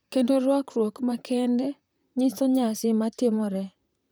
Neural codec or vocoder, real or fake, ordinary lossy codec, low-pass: vocoder, 44.1 kHz, 128 mel bands, Pupu-Vocoder; fake; none; none